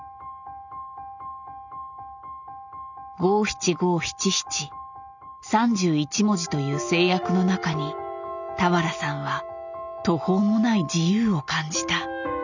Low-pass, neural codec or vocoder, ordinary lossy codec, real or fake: 7.2 kHz; none; none; real